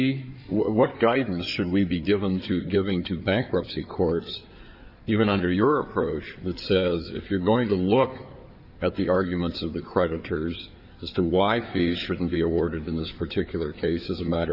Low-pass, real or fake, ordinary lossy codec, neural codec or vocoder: 5.4 kHz; fake; Opus, 64 kbps; codec, 16 kHz in and 24 kHz out, 2.2 kbps, FireRedTTS-2 codec